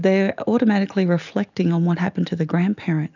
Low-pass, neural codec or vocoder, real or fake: 7.2 kHz; none; real